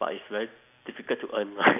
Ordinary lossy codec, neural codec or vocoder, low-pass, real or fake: none; none; 3.6 kHz; real